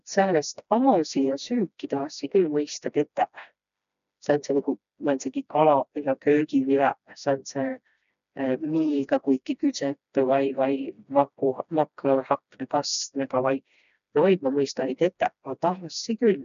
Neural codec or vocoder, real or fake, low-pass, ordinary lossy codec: codec, 16 kHz, 1 kbps, FreqCodec, smaller model; fake; 7.2 kHz; none